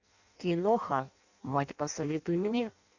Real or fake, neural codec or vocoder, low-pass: fake; codec, 16 kHz in and 24 kHz out, 0.6 kbps, FireRedTTS-2 codec; 7.2 kHz